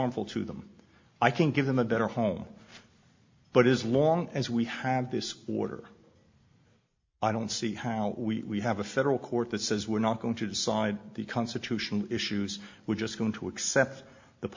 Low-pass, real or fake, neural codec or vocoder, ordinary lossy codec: 7.2 kHz; real; none; MP3, 48 kbps